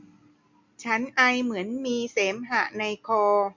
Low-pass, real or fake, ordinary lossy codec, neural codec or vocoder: 7.2 kHz; real; MP3, 48 kbps; none